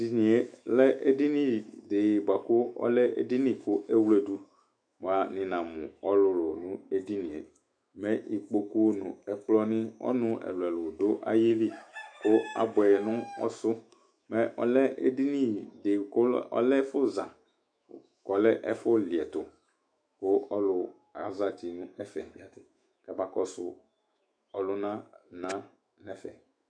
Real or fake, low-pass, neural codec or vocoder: fake; 9.9 kHz; autoencoder, 48 kHz, 128 numbers a frame, DAC-VAE, trained on Japanese speech